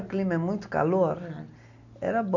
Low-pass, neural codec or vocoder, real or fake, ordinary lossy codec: 7.2 kHz; none; real; none